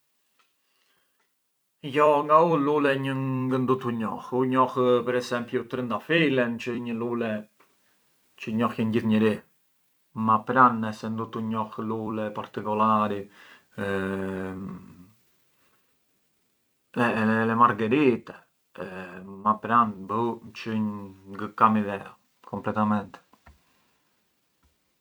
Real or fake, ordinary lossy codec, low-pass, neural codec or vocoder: fake; none; none; vocoder, 44.1 kHz, 128 mel bands every 256 samples, BigVGAN v2